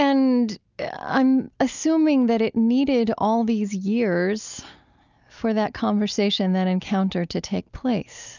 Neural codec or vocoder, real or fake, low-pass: none; real; 7.2 kHz